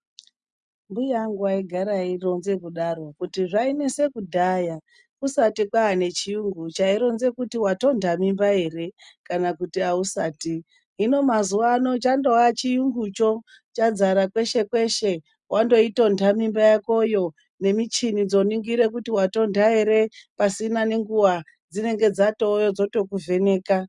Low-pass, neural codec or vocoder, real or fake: 10.8 kHz; none; real